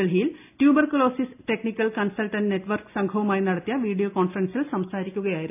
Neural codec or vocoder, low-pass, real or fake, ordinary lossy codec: none; 3.6 kHz; real; AAC, 32 kbps